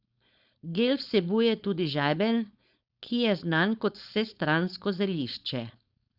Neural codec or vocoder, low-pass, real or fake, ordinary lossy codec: codec, 16 kHz, 4.8 kbps, FACodec; 5.4 kHz; fake; Opus, 64 kbps